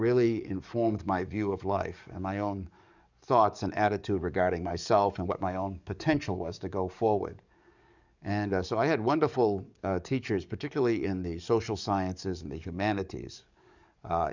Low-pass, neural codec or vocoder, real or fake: 7.2 kHz; codec, 44.1 kHz, 7.8 kbps, DAC; fake